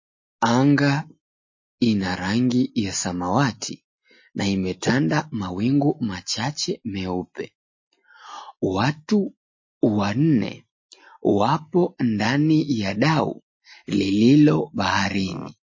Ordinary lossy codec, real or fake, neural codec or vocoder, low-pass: MP3, 32 kbps; real; none; 7.2 kHz